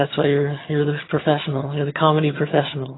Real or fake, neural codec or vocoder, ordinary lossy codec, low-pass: fake; vocoder, 22.05 kHz, 80 mel bands, HiFi-GAN; AAC, 16 kbps; 7.2 kHz